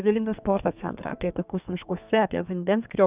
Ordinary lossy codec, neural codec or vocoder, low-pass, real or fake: Opus, 64 kbps; codec, 32 kHz, 1.9 kbps, SNAC; 3.6 kHz; fake